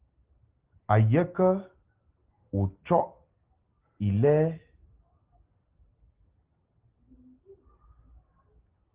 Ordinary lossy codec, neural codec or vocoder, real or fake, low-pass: Opus, 16 kbps; none; real; 3.6 kHz